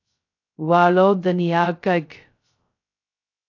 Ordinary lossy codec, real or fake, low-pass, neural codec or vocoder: AAC, 48 kbps; fake; 7.2 kHz; codec, 16 kHz, 0.2 kbps, FocalCodec